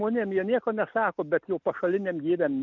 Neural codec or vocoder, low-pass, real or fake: none; 7.2 kHz; real